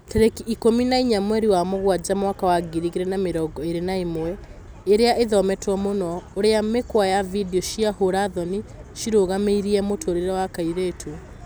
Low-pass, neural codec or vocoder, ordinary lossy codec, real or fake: none; none; none; real